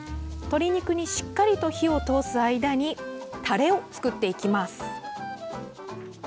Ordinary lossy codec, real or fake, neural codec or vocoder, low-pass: none; real; none; none